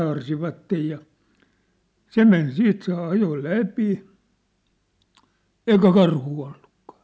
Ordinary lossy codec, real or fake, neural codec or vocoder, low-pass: none; real; none; none